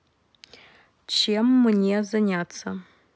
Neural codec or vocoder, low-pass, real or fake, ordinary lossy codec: none; none; real; none